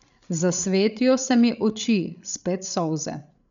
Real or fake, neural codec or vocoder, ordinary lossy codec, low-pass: fake; codec, 16 kHz, 8 kbps, FreqCodec, larger model; none; 7.2 kHz